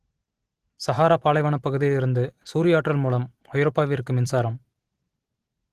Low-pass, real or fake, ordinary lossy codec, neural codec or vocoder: 14.4 kHz; real; Opus, 16 kbps; none